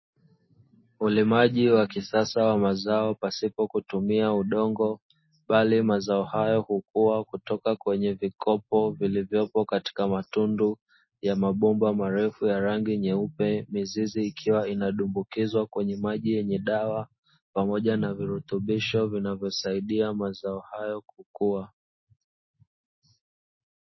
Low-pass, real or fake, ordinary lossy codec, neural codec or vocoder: 7.2 kHz; real; MP3, 24 kbps; none